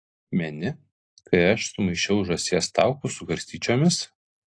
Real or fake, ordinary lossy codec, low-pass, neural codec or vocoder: real; AAC, 48 kbps; 9.9 kHz; none